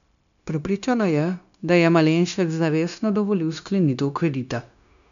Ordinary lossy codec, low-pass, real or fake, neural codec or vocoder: none; 7.2 kHz; fake; codec, 16 kHz, 0.9 kbps, LongCat-Audio-Codec